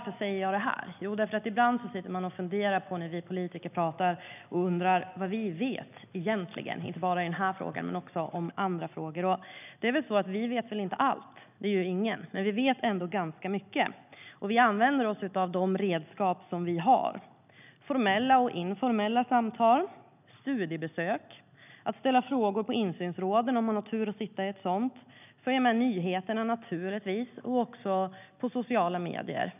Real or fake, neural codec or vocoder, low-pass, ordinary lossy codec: real; none; 3.6 kHz; none